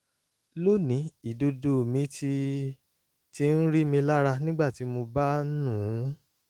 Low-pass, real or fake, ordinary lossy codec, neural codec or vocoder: 19.8 kHz; fake; Opus, 24 kbps; autoencoder, 48 kHz, 128 numbers a frame, DAC-VAE, trained on Japanese speech